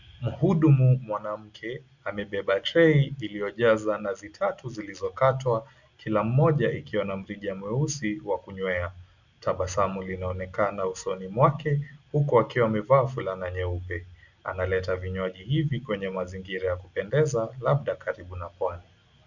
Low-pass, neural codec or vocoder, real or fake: 7.2 kHz; none; real